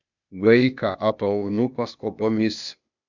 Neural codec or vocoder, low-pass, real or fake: codec, 16 kHz, 0.8 kbps, ZipCodec; 7.2 kHz; fake